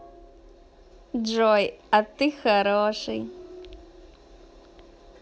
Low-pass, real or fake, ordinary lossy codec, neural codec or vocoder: none; real; none; none